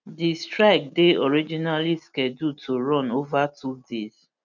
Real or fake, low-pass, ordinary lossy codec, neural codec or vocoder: real; 7.2 kHz; none; none